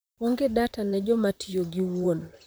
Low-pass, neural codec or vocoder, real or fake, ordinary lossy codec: none; vocoder, 44.1 kHz, 128 mel bands, Pupu-Vocoder; fake; none